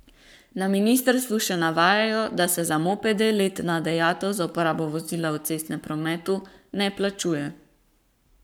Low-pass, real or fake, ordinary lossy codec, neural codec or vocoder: none; fake; none; codec, 44.1 kHz, 7.8 kbps, Pupu-Codec